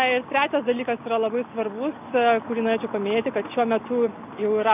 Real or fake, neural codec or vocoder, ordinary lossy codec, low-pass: real; none; AAC, 32 kbps; 3.6 kHz